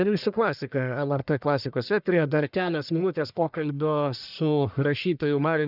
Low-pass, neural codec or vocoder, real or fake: 5.4 kHz; codec, 44.1 kHz, 1.7 kbps, Pupu-Codec; fake